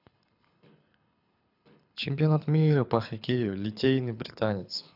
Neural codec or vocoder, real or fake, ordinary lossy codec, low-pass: codec, 24 kHz, 6 kbps, HILCodec; fake; none; 5.4 kHz